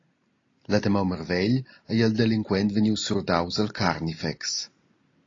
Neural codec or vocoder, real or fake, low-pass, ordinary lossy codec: none; real; 7.2 kHz; AAC, 32 kbps